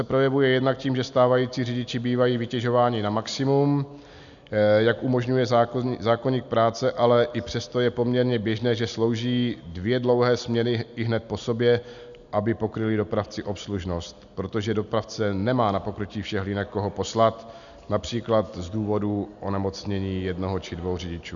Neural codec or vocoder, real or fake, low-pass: none; real; 7.2 kHz